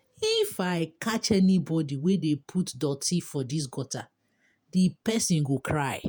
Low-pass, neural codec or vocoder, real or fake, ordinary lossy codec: none; vocoder, 48 kHz, 128 mel bands, Vocos; fake; none